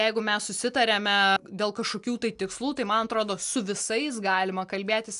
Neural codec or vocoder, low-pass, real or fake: none; 10.8 kHz; real